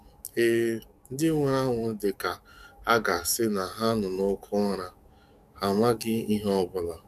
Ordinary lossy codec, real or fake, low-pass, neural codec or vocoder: none; fake; 14.4 kHz; codec, 44.1 kHz, 7.8 kbps, DAC